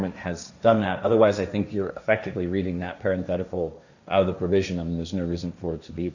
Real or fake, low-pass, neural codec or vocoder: fake; 7.2 kHz; codec, 16 kHz, 1.1 kbps, Voila-Tokenizer